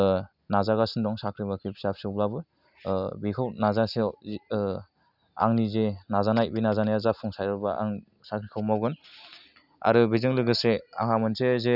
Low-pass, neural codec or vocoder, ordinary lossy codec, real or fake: 5.4 kHz; none; none; real